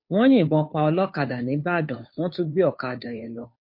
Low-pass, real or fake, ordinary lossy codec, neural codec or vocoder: 5.4 kHz; fake; MP3, 32 kbps; codec, 16 kHz, 2 kbps, FunCodec, trained on Chinese and English, 25 frames a second